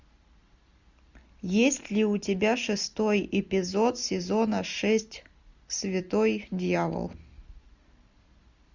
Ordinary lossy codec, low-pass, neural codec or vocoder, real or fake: Opus, 64 kbps; 7.2 kHz; none; real